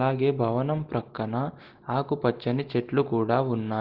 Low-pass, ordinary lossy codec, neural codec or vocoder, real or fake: 5.4 kHz; Opus, 16 kbps; none; real